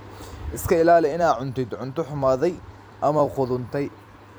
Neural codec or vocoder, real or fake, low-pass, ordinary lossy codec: vocoder, 44.1 kHz, 128 mel bands every 256 samples, BigVGAN v2; fake; none; none